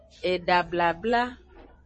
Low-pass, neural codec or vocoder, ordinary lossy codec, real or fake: 9.9 kHz; vocoder, 22.05 kHz, 80 mel bands, Vocos; MP3, 32 kbps; fake